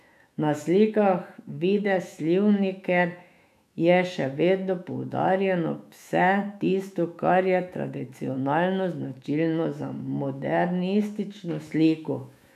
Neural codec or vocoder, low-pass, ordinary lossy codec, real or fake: autoencoder, 48 kHz, 128 numbers a frame, DAC-VAE, trained on Japanese speech; 14.4 kHz; none; fake